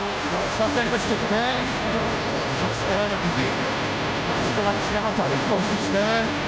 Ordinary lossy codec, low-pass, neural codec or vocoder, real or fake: none; none; codec, 16 kHz, 0.5 kbps, FunCodec, trained on Chinese and English, 25 frames a second; fake